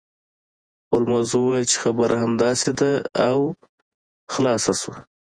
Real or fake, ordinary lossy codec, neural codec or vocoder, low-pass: fake; Opus, 64 kbps; vocoder, 48 kHz, 128 mel bands, Vocos; 9.9 kHz